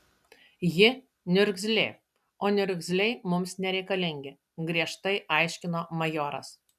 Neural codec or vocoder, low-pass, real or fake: none; 14.4 kHz; real